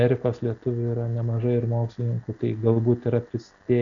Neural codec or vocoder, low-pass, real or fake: none; 7.2 kHz; real